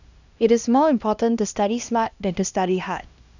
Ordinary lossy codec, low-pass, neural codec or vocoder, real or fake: none; 7.2 kHz; codec, 16 kHz, 1 kbps, X-Codec, HuBERT features, trained on LibriSpeech; fake